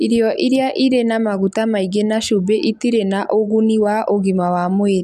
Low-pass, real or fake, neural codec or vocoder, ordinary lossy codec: 14.4 kHz; real; none; none